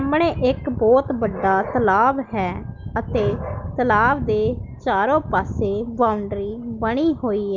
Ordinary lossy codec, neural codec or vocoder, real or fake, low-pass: Opus, 24 kbps; none; real; 7.2 kHz